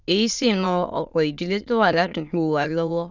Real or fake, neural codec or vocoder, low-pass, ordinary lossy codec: fake; autoencoder, 22.05 kHz, a latent of 192 numbers a frame, VITS, trained on many speakers; 7.2 kHz; none